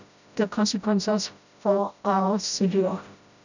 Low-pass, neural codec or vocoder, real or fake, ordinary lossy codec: 7.2 kHz; codec, 16 kHz, 0.5 kbps, FreqCodec, smaller model; fake; none